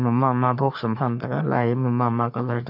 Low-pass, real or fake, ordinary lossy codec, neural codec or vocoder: 5.4 kHz; fake; none; codec, 44.1 kHz, 3.4 kbps, Pupu-Codec